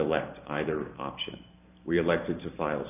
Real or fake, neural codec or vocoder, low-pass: fake; vocoder, 44.1 kHz, 128 mel bands every 512 samples, BigVGAN v2; 3.6 kHz